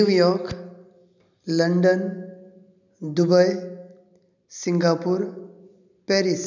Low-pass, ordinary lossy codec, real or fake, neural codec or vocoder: 7.2 kHz; none; real; none